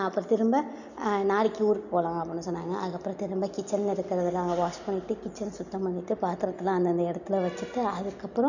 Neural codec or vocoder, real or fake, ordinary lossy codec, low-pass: none; real; none; 7.2 kHz